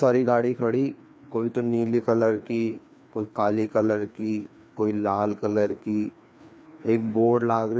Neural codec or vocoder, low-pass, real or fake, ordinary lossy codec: codec, 16 kHz, 2 kbps, FreqCodec, larger model; none; fake; none